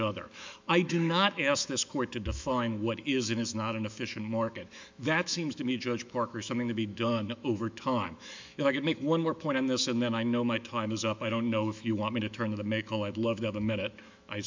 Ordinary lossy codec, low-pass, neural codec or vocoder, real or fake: MP3, 64 kbps; 7.2 kHz; autoencoder, 48 kHz, 128 numbers a frame, DAC-VAE, trained on Japanese speech; fake